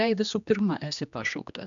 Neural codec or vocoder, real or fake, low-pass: codec, 16 kHz, 2 kbps, X-Codec, HuBERT features, trained on general audio; fake; 7.2 kHz